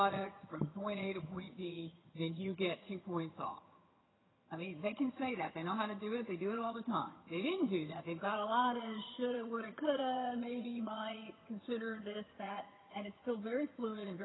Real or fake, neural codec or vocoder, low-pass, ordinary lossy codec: fake; vocoder, 22.05 kHz, 80 mel bands, HiFi-GAN; 7.2 kHz; AAC, 16 kbps